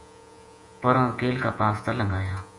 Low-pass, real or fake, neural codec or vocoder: 10.8 kHz; fake; vocoder, 48 kHz, 128 mel bands, Vocos